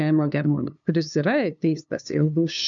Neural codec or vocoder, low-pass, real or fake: codec, 16 kHz, 2 kbps, FunCodec, trained on LibriTTS, 25 frames a second; 7.2 kHz; fake